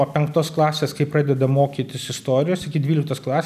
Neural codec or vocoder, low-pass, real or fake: none; 14.4 kHz; real